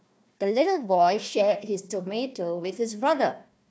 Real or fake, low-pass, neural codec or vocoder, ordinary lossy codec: fake; none; codec, 16 kHz, 1 kbps, FunCodec, trained on Chinese and English, 50 frames a second; none